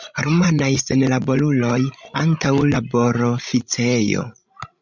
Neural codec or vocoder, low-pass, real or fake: codec, 16 kHz, 16 kbps, FreqCodec, larger model; 7.2 kHz; fake